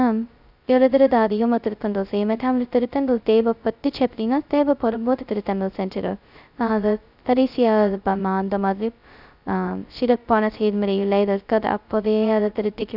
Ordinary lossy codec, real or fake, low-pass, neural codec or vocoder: none; fake; 5.4 kHz; codec, 16 kHz, 0.2 kbps, FocalCodec